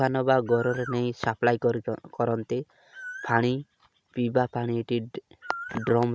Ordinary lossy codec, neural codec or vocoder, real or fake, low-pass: none; none; real; none